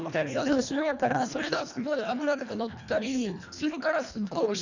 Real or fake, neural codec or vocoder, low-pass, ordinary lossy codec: fake; codec, 24 kHz, 1.5 kbps, HILCodec; 7.2 kHz; none